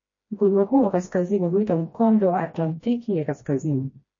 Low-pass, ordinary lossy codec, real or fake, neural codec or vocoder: 7.2 kHz; MP3, 32 kbps; fake; codec, 16 kHz, 1 kbps, FreqCodec, smaller model